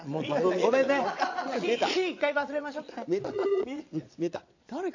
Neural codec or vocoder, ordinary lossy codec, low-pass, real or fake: vocoder, 22.05 kHz, 80 mel bands, Vocos; AAC, 48 kbps; 7.2 kHz; fake